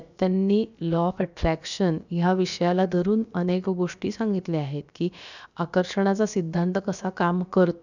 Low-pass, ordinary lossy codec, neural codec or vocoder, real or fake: 7.2 kHz; none; codec, 16 kHz, about 1 kbps, DyCAST, with the encoder's durations; fake